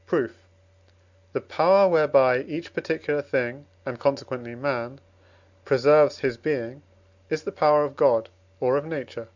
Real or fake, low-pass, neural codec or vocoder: real; 7.2 kHz; none